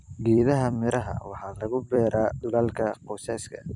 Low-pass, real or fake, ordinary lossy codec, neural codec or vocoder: 10.8 kHz; fake; none; vocoder, 44.1 kHz, 128 mel bands every 256 samples, BigVGAN v2